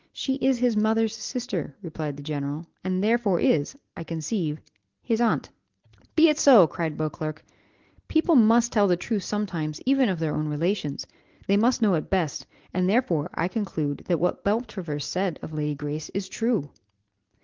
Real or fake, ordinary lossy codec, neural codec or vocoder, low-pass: real; Opus, 16 kbps; none; 7.2 kHz